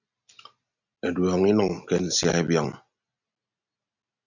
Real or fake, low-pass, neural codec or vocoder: real; 7.2 kHz; none